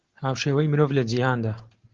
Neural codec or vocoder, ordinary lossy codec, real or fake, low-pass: none; Opus, 16 kbps; real; 7.2 kHz